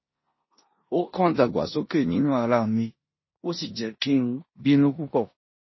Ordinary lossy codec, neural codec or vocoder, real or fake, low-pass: MP3, 24 kbps; codec, 16 kHz in and 24 kHz out, 0.9 kbps, LongCat-Audio-Codec, four codebook decoder; fake; 7.2 kHz